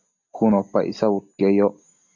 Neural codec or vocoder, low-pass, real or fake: none; 7.2 kHz; real